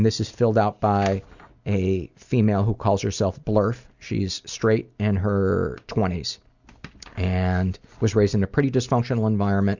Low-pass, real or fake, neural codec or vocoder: 7.2 kHz; real; none